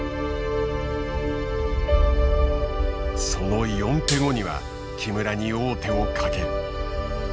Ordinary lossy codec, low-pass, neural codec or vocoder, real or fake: none; none; none; real